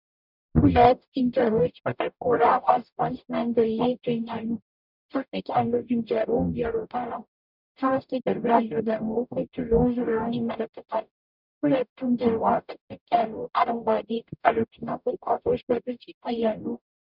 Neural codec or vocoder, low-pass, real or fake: codec, 44.1 kHz, 0.9 kbps, DAC; 5.4 kHz; fake